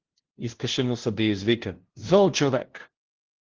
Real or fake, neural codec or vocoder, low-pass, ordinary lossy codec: fake; codec, 16 kHz, 0.5 kbps, FunCodec, trained on LibriTTS, 25 frames a second; 7.2 kHz; Opus, 16 kbps